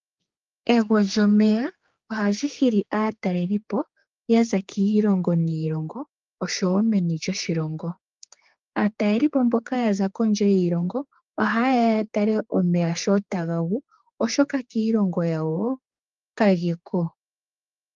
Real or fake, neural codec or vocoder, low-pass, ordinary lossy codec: fake; codec, 16 kHz, 4 kbps, X-Codec, HuBERT features, trained on general audio; 7.2 kHz; Opus, 32 kbps